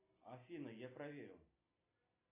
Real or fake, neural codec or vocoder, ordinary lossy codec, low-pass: real; none; AAC, 32 kbps; 3.6 kHz